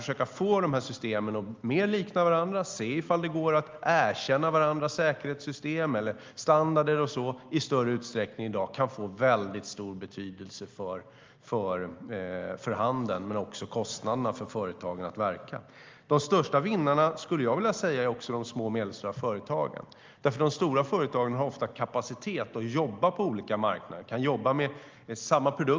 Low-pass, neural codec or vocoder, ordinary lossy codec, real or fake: 7.2 kHz; none; Opus, 32 kbps; real